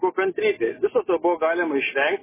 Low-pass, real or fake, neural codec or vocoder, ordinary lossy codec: 3.6 kHz; real; none; MP3, 16 kbps